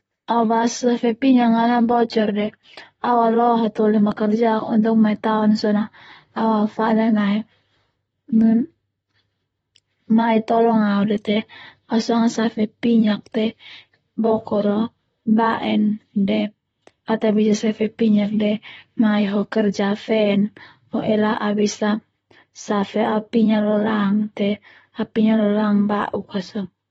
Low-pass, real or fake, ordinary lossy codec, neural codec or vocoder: 19.8 kHz; fake; AAC, 24 kbps; vocoder, 44.1 kHz, 128 mel bands, Pupu-Vocoder